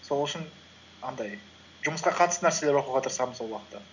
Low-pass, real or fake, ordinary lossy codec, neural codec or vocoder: 7.2 kHz; real; none; none